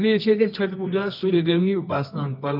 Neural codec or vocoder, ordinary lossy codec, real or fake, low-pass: codec, 24 kHz, 0.9 kbps, WavTokenizer, medium music audio release; none; fake; 5.4 kHz